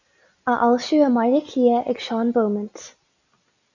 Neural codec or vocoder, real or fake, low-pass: none; real; 7.2 kHz